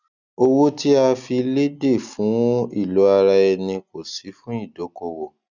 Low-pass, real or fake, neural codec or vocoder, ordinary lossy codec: 7.2 kHz; real; none; none